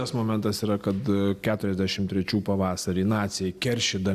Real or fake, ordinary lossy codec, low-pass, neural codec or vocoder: real; Opus, 64 kbps; 14.4 kHz; none